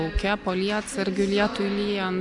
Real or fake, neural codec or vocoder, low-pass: real; none; 10.8 kHz